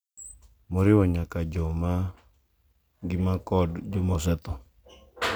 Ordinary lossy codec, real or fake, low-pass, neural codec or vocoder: none; real; none; none